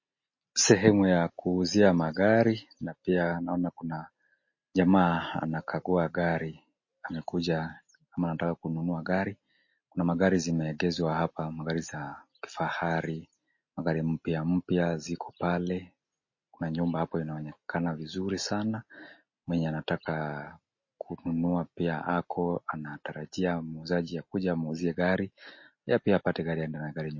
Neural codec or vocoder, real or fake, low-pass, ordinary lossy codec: none; real; 7.2 kHz; MP3, 32 kbps